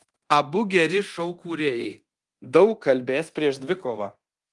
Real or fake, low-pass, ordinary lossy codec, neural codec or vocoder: fake; 10.8 kHz; Opus, 24 kbps; codec, 24 kHz, 0.9 kbps, DualCodec